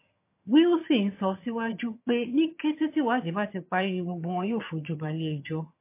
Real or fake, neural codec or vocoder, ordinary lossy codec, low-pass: fake; vocoder, 22.05 kHz, 80 mel bands, HiFi-GAN; MP3, 24 kbps; 3.6 kHz